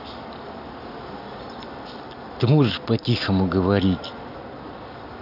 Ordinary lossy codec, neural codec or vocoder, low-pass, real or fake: none; none; 5.4 kHz; real